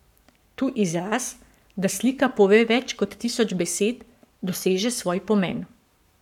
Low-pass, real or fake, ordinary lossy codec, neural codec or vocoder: 19.8 kHz; fake; none; codec, 44.1 kHz, 7.8 kbps, Pupu-Codec